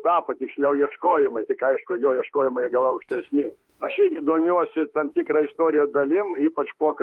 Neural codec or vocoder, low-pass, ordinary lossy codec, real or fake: autoencoder, 48 kHz, 32 numbers a frame, DAC-VAE, trained on Japanese speech; 19.8 kHz; Opus, 32 kbps; fake